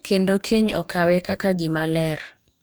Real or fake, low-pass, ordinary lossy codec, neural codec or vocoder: fake; none; none; codec, 44.1 kHz, 2.6 kbps, DAC